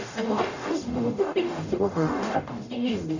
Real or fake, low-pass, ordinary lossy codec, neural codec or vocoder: fake; 7.2 kHz; none; codec, 44.1 kHz, 0.9 kbps, DAC